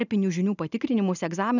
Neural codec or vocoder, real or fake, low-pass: none; real; 7.2 kHz